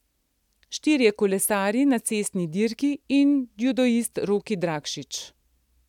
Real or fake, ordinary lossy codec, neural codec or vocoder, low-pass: real; none; none; 19.8 kHz